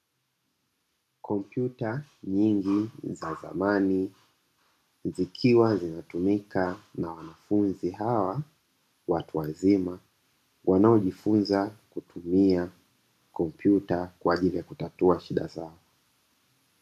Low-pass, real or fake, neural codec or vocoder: 14.4 kHz; real; none